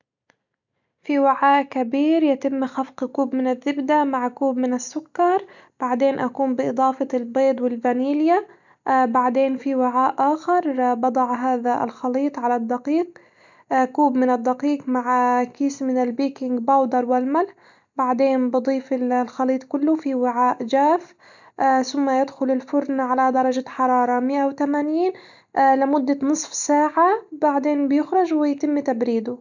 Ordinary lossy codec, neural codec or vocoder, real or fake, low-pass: none; none; real; 7.2 kHz